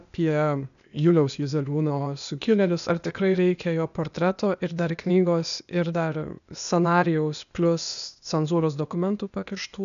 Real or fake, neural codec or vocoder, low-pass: fake; codec, 16 kHz, 0.8 kbps, ZipCodec; 7.2 kHz